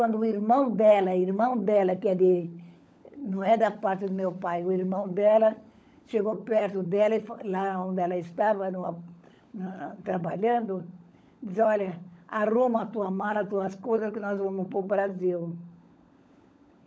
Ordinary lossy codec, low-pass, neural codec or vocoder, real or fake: none; none; codec, 16 kHz, 16 kbps, FunCodec, trained on LibriTTS, 50 frames a second; fake